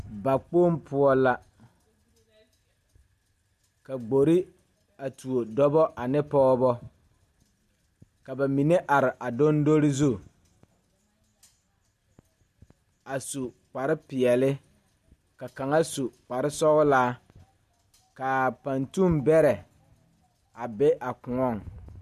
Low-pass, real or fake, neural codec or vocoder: 14.4 kHz; real; none